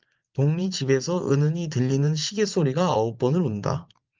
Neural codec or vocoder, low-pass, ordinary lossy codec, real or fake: vocoder, 44.1 kHz, 80 mel bands, Vocos; 7.2 kHz; Opus, 32 kbps; fake